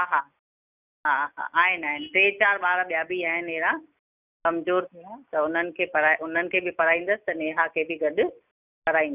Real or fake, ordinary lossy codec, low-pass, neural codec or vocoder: real; none; 3.6 kHz; none